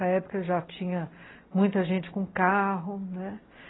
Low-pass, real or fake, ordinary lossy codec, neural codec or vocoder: 7.2 kHz; real; AAC, 16 kbps; none